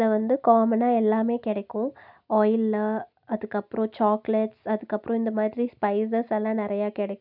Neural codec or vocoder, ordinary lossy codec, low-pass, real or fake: none; none; 5.4 kHz; real